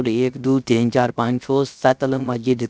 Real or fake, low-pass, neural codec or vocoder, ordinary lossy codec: fake; none; codec, 16 kHz, 0.3 kbps, FocalCodec; none